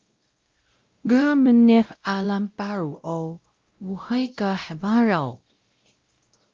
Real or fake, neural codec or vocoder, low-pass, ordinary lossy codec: fake; codec, 16 kHz, 0.5 kbps, X-Codec, WavLM features, trained on Multilingual LibriSpeech; 7.2 kHz; Opus, 24 kbps